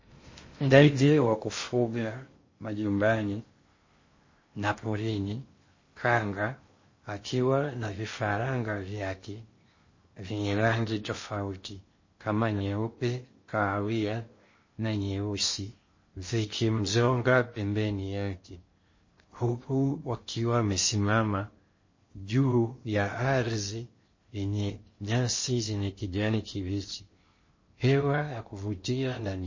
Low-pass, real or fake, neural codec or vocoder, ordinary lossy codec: 7.2 kHz; fake; codec, 16 kHz in and 24 kHz out, 0.6 kbps, FocalCodec, streaming, 2048 codes; MP3, 32 kbps